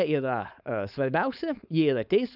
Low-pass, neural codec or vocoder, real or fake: 5.4 kHz; codec, 16 kHz, 4.8 kbps, FACodec; fake